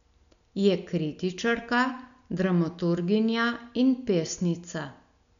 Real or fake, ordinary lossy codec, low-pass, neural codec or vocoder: real; none; 7.2 kHz; none